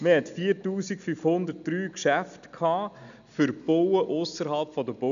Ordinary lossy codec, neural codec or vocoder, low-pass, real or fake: none; none; 7.2 kHz; real